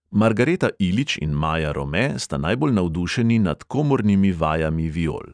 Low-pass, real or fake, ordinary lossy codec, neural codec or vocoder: 9.9 kHz; real; none; none